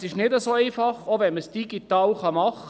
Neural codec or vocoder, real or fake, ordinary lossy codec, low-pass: none; real; none; none